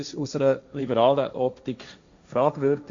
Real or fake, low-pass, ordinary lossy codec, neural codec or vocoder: fake; 7.2 kHz; AAC, 64 kbps; codec, 16 kHz, 1.1 kbps, Voila-Tokenizer